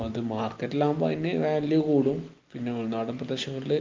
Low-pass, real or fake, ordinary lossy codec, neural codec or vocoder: 7.2 kHz; real; Opus, 32 kbps; none